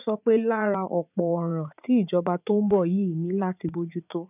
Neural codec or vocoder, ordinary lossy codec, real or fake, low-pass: vocoder, 44.1 kHz, 80 mel bands, Vocos; none; fake; 3.6 kHz